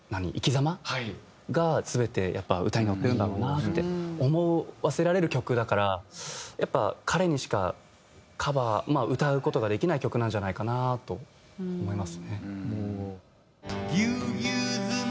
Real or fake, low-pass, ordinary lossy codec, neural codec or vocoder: real; none; none; none